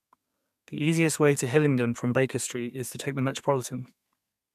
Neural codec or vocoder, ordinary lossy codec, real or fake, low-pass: codec, 32 kHz, 1.9 kbps, SNAC; none; fake; 14.4 kHz